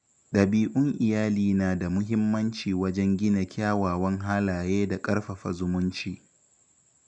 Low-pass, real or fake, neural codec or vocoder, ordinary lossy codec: 9.9 kHz; real; none; none